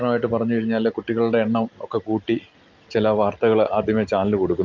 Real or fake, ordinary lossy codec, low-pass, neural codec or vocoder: real; Opus, 32 kbps; 7.2 kHz; none